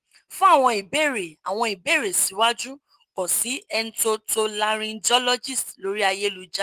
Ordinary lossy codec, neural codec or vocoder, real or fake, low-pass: Opus, 24 kbps; none; real; 14.4 kHz